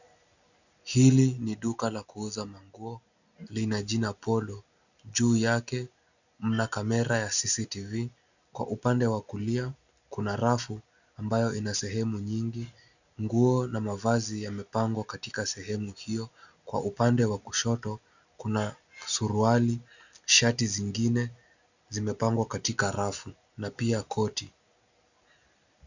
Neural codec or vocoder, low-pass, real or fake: none; 7.2 kHz; real